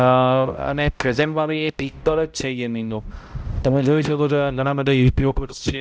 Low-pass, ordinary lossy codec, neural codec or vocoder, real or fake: none; none; codec, 16 kHz, 0.5 kbps, X-Codec, HuBERT features, trained on balanced general audio; fake